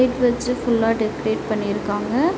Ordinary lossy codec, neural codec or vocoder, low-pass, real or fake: none; none; none; real